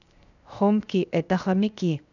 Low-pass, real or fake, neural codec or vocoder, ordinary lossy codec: 7.2 kHz; fake; codec, 16 kHz, 0.7 kbps, FocalCodec; none